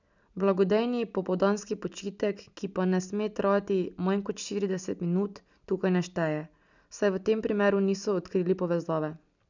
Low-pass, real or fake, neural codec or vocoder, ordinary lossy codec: 7.2 kHz; real; none; none